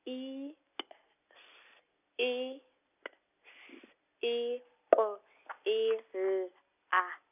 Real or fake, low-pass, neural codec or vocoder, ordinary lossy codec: real; 3.6 kHz; none; none